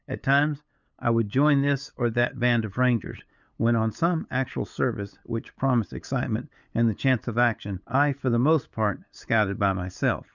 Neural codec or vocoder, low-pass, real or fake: codec, 16 kHz, 8 kbps, FunCodec, trained on LibriTTS, 25 frames a second; 7.2 kHz; fake